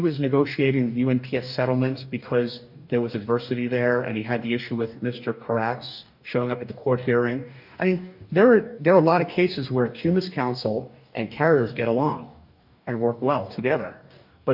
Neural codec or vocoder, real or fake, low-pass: codec, 44.1 kHz, 2.6 kbps, DAC; fake; 5.4 kHz